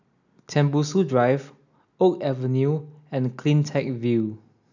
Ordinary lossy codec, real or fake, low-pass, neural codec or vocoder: none; real; 7.2 kHz; none